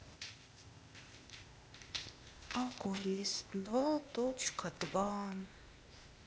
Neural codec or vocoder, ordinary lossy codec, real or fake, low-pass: codec, 16 kHz, 0.8 kbps, ZipCodec; none; fake; none